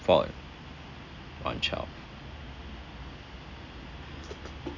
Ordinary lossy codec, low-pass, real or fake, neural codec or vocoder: none; 7.2 kHz; real; none